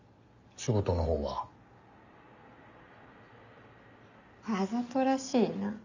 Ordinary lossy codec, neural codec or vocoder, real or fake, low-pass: none; none; real; 7.2 kHz